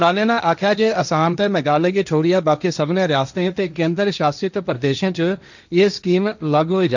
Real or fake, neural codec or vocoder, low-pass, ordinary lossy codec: fake; codec, 16 kHz, 1.1 kbps, Voila-Tokenizer; 7.2 kHz; none